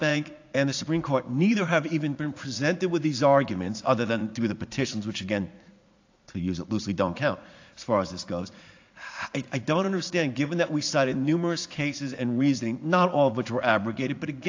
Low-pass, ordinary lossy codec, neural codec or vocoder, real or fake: 7.2 kHz; AAC, 48 kbps; vocoder, 44.1 kHz, 80 mel bands, Vocos; fake